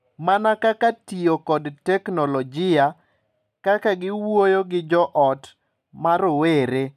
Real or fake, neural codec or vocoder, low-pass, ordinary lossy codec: real; none; 14.4 kHz; none